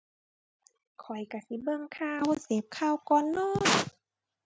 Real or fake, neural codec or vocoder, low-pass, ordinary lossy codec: real; none; none; none